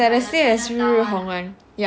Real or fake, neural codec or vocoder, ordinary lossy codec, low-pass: real; none; none; none